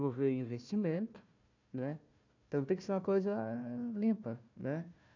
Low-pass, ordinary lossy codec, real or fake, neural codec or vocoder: 7.2 kHz; none; fake; codec, 16 kHz, 1 kbps, FunCodec, trained on Chinese and English, 50 frames a second